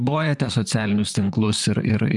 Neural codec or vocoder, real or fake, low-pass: vocoder, 44.1 kHz, 128 mel bands, Pupu-Vocoder; fake; 10.8 kHz